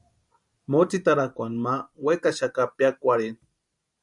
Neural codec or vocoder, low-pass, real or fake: vocoder, 44.1 kHz, 128 mel bands every 512 samples, BigVGAN v2; 10.8 kHz; fake